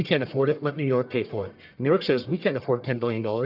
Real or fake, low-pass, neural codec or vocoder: fake; 5.4 kHz; codec, 44.1 kHz, 1.7 kbps, Pupu-Codec